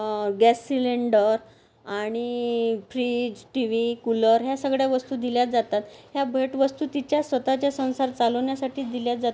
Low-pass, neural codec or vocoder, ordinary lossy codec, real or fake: none; none; none; real